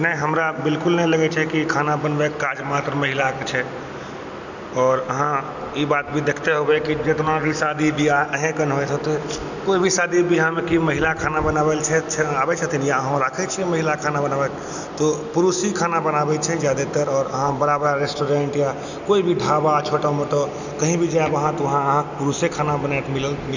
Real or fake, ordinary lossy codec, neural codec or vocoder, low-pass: real; none; none; 7.2 kHz